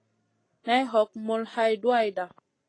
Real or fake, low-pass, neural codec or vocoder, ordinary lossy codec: real; 9.9 kHz; none; AAC, 32 kbps